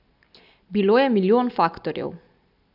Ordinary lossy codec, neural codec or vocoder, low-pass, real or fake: none; none; 5.4 kHz; real